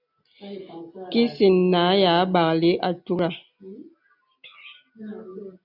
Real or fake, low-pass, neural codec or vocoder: real; 5.4 kHz; none